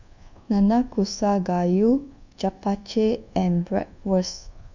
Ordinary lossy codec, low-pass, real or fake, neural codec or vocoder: none; 7.2 kHz; fake; codec, 24 kHz, 1.2 kbps, DualCodec